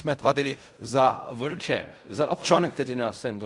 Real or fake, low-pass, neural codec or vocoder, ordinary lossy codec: fake; 10.8 kHz; codec, 16 kHz in and 24 kHz out, 0.4 kbps, LongCat-Audio-Codec, fine tuned four codebook decoder; Opus, 64 kbps